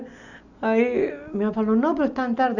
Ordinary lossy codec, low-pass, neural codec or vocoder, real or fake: none; 7.2 kHz; none; real